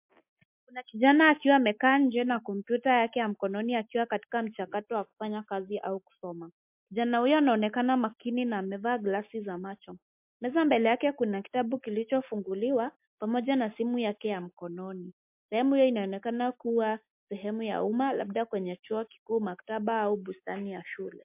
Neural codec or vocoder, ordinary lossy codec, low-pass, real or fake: none; MP3, 32 kbps; 3.6 kHz; real